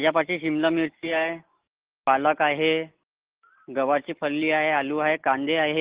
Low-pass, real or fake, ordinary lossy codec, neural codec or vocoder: 3.6 kHz; real; Opus, 16 kbps; none